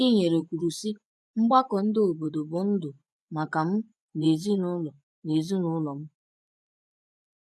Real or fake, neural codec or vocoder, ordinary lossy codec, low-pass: fake; vocoder, 24 kHz, 100 mel bands, Vocos; none; none